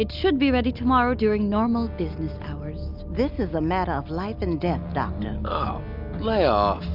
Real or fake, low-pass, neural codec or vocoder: real; 5.4 kHz; none